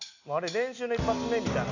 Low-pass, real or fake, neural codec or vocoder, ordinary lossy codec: 7.2 kHz; real; none; none